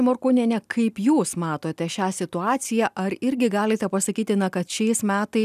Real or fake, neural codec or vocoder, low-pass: real; none; 14.4 kHz